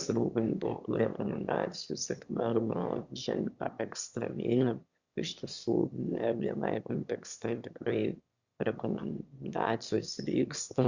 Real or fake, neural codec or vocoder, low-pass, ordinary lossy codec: fake; autoencoder, 22.05 kHz, a latent of 192 numbers a frame, VITS, trained on one speaker; 7.2 kHz; Opus, 64 kbps